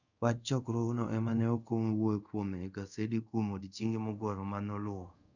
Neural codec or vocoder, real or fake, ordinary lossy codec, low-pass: codec, 24 kHz, 0.5 kbps, DualCodec; fake; none; 7.2 kHz